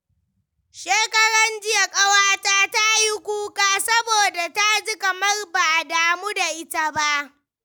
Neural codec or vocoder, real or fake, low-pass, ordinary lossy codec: none; real; none; none